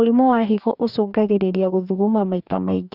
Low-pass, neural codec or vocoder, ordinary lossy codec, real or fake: 5.4 kHz; codec, 44.1 kHz, 2.6 kbps, DAC; none; fake